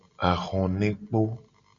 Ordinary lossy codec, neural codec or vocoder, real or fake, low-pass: MP3, 64 kbps; none; real; 7.2 kHz